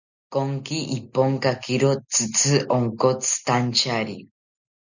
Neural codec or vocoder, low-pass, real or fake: none; 7.2 kHz; real